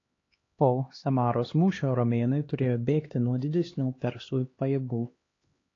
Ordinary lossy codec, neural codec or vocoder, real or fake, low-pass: AAC, 32 kbps; codec, 16 kHz, 2 kbps, X-Codec, HuBERT features, trained on LibriSpeech; fake; 7.2 kHz